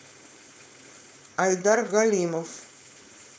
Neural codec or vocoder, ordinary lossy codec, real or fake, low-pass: codec, 16 kHz, 4.8 kbps, FACodec; none; fake; none